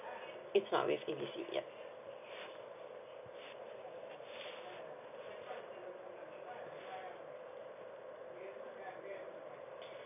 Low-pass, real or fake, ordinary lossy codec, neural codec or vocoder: 3.6 kHz; real; none; none